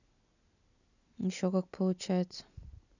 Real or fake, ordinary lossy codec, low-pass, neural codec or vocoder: real; none; 7.2 kHz; none